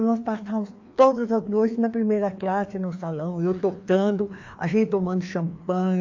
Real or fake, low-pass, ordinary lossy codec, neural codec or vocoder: fake; 7.2 kHz; AAC, 48 kbps; codec, 16 kHz, 2 kbps, FreqCodec, larger model